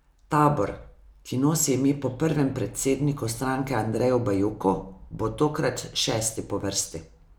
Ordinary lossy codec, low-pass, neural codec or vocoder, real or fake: none; none; none; real